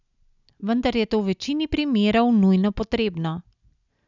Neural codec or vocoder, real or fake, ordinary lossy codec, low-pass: none; real; none; 7.2 kHz